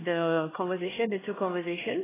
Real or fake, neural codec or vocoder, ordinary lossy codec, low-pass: fake; codec, 16 kHz, 1 kbps, X-Codec, HuBERT features, trained on LibriSpeech; AAC, 16 kbps; 3.6 kHz